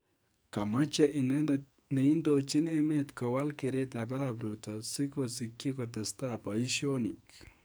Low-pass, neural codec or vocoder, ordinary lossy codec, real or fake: none; codec, 44.1 kHz, 2.6 kbps, SNAC; none; fake